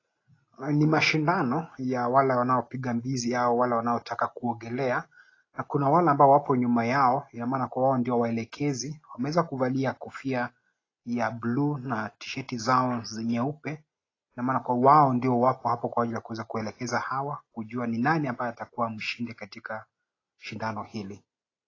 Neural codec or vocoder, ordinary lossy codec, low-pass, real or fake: none; AAC, 32 kbps; 7.2 kHz; real